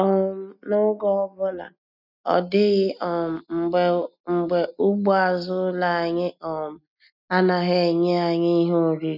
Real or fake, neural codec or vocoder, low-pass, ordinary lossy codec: real; none; 5.4 kHz; none